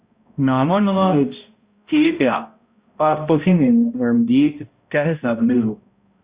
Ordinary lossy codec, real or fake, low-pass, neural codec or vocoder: Opus, 64 kbps; fake; 3.6 kHz; codec, 16 kHz, 0.5 kbps, X-Codec, HuBERT features, trained on balanced general audio